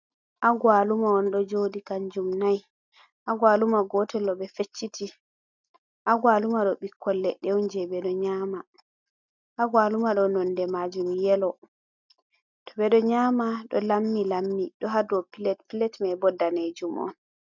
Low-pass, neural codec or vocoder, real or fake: 7.2 kHz; none; real